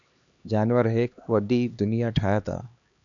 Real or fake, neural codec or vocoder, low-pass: fake; codec, 16 kHz, 2 kbps, X-Codec, HuBERT features, trained on LibriSpeech; 7.2 kHz